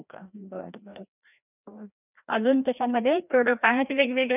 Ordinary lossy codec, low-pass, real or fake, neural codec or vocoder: none; 3.6 kHz; fake; codec, 16 kHz, 1 kbps, FreqCodec, larger model